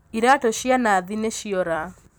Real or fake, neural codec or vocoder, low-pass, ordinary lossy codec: fake; vocoder, 44.1 kHz, 128 mel bands every 256 samples, BigVGAN v2; none; none